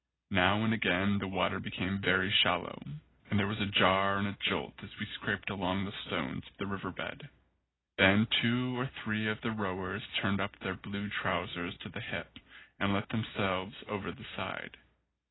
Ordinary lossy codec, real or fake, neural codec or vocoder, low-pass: AAC, 16 kbps; real; none; 7.2 kHz